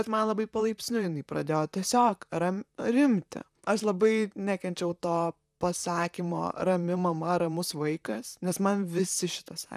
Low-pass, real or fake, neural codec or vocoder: 14.4 kHz; fake; vocoder, 44.1 kHz, 128 mel bands, Pupu-Vocoder